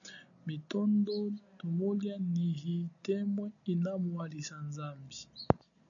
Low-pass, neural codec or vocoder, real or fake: 7.2 kHz; none; real